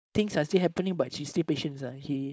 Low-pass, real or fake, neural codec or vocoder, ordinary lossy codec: none; fake; codec, 16 kHz, 4.8 kbps, FACodec; none